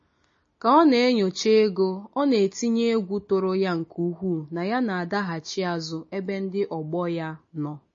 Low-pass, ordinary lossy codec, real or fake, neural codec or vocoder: 7.2 kHz; MP3, 32 kbps; real; none